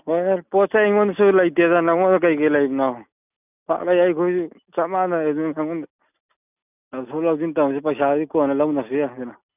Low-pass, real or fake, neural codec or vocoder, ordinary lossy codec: 3.6 kHz; real; none; none